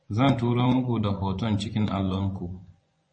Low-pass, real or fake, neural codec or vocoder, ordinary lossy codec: 9.9 kHz; fake; vocoder, 24 kHz, 100 mel bands, Vocos; MP3, 32 kbps